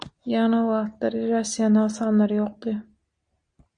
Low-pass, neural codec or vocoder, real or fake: 9.9 kHz; none; real